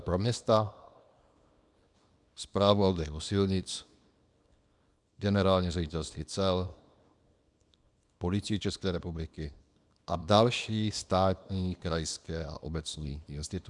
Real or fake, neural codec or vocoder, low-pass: fake; codec, 24 kHz, 0.9 kbps, WavTokenizer, small release; 10.8 kHz